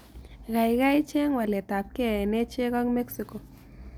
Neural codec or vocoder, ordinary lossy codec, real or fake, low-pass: none; none; real; none